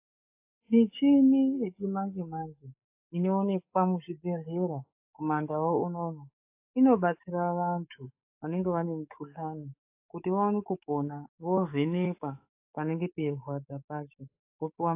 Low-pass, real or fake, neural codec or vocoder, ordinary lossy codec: 3.6 kHz; fake; codec, 44.1 kHz, 7.8 kbps, DAC; AAC, 24 kbps